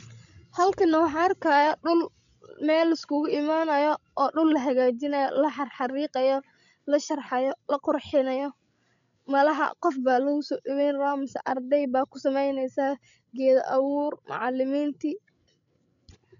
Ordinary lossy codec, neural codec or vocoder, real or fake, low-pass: MP3, 96 kbps; codec, 16 kHz, 16 kbps, FreqCodec, larger model; fake; 7.2 kHz